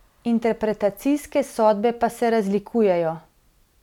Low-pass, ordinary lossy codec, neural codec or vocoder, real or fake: 19.8 kHz; none; none; real